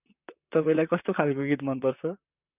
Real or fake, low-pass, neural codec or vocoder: fake; 3.6 kHz; vocoder, 44.1 kHz, 128 mel bands, Pupu-Vocoder